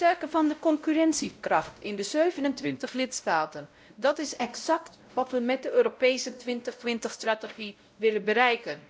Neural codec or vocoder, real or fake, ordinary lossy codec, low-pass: codec, 16 kHz, 0.5 kbps, X-Codec, WavLM features, trained on Multilingual LibriSpeech; fake; none; none